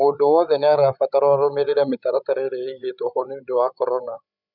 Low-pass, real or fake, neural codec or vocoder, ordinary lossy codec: 5.4 kHz; fake; codec, 16 kHz, 16 kbps, FreqCodec, larger model; none